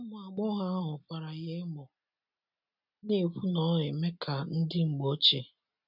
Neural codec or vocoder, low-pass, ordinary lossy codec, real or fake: none; 5.4 kHz; none; real